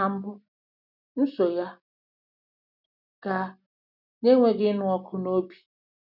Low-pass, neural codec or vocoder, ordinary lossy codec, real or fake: 5.4 kHz; none; none; real